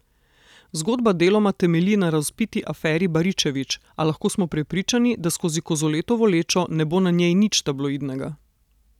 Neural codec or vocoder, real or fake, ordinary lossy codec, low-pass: none; real; none; 19.8 kHz